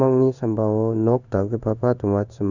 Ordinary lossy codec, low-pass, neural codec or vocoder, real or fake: none; 7.2 kHz; codec, 16 kHz in and 24 kHz out, 1 kbps, XY-Tokenizer; fake